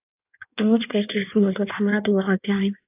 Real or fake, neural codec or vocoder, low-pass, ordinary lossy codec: fake; codec, 16 kHz in and 24 kHz out, 1.1 kbps, FireRedTTS-2 codec; 3.6 kHz; AAC, 32 kbps